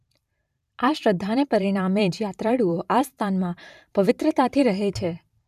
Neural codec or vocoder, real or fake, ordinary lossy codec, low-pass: vocoder, 44.1 kHz, 128 mel bands every 512 samples, BigVGAN v2; fake; none; 14.4 kHz